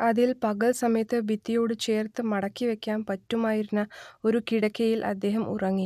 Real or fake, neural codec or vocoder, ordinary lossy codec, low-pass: real; none; none; 14.4 kHz